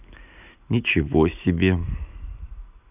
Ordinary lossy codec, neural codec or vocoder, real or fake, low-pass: none; none; real; 3.6 kHz